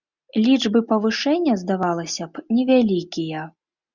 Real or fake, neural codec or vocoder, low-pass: real; none; 7.2 kHz